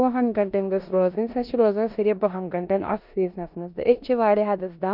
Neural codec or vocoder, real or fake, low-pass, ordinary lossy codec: codec, 16 kHz in and 24 kHz out, 0.9 kbps, LongCat-Audio-Codec, four codebook decoder; fake; 5.4 kHz; none